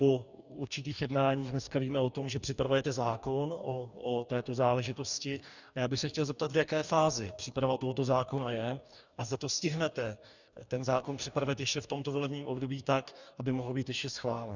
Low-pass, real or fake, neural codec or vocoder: 7.2 kHz; fake; codec, 44.1 kHz, 2.6 kbps, DAC